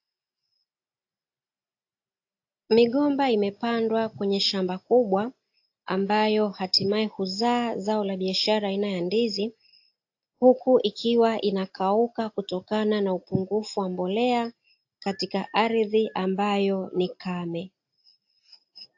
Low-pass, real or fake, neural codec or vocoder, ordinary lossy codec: 7.2 kHz; real; none; AAC, 48 kbps